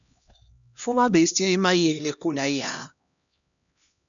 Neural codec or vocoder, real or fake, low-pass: codec, 16 kHz, 1 kbps, X-Codec, HuBERT features, trained on LibriSpeech; fake; 7.2 kHz